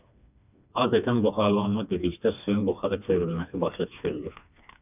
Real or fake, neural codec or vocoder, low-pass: fake; codec, 16 kHz, 2 kbps, FreqCodec, smaller model; 3.6 kHz